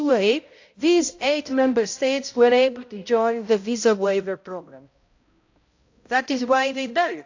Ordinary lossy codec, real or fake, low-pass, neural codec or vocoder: AAC, 48 kbps; fake; 7.2 kHz; codec, 16 kHz, 0.5 kbps, X-Codec, HuBERT features, trained on balanced general audio